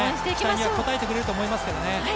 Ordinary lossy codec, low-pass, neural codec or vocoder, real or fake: none; none; none; real